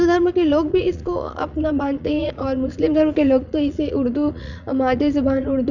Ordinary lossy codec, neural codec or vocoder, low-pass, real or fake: none; vocoder, 44.1 kHz, 80 mel bands, Vocos; 7.2 kHz; fake